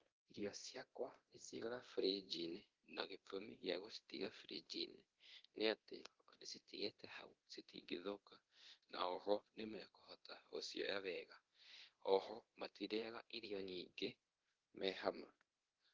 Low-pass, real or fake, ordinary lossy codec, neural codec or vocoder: 7.2 kHz; fake; Opus, 16 kbps; codec, 24 kHz, 0.9 kbps, DualCodec